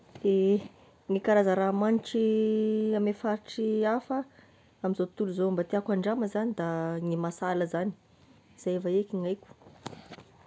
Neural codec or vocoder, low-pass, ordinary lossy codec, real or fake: none; none; none; real